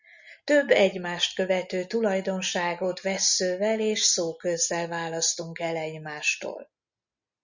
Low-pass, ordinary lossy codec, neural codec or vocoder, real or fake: 7.2 kHz; Opus, 64 kbps; none; real